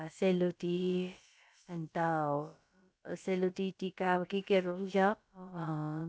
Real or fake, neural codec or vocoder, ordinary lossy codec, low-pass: fake; codec, 16 kHz, about 1 kbps, DyCAST, with the encoder's durations; none; none